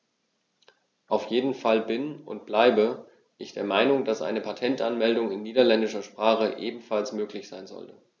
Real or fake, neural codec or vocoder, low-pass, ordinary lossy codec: real; none; none; none